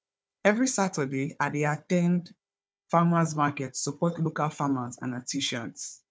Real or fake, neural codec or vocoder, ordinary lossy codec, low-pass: fake; codec, 16 kHz, 4 kbps, FunCodec, trained on Chinese and English, 50 frames a second; none; none